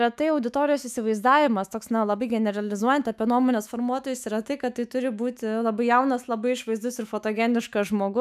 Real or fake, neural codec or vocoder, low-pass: fake; autoencoder, 48 kHz, 128 numbers a frame, DAC-VAE, trained on Japanese speech; 14.4 kHz